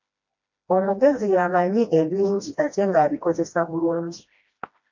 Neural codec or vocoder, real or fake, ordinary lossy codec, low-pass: codec, 16 kHz, 1 kbps, FreqCodec, smaller model; fake; MP3, 48 kbps; 7.2 kHz